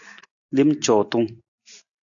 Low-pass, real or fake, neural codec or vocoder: 7.2 kHz; real; none